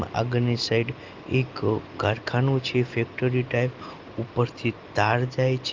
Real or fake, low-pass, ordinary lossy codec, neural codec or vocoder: real; 7.2 kHz; Opus, 32 kbps; none